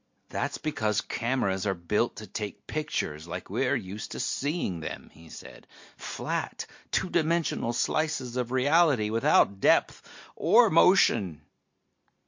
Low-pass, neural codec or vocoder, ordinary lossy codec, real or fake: 7.2 kHz; none; MP3, 48 kbps; real